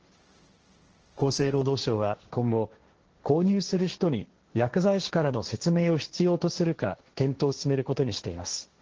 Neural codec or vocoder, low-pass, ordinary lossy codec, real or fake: codec, 16 kHz, 1.1 kbps, Voila-Tokenizer; 7.2 kHz; Opus, 16 kbps; fake